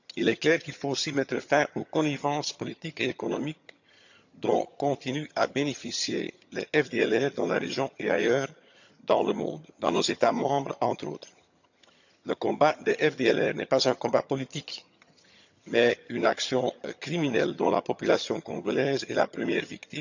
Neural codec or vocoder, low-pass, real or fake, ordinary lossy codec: vocoder, 22.05 kHz, 80 mel bands, HiFi-GAN; 7.2 kHz; fake; none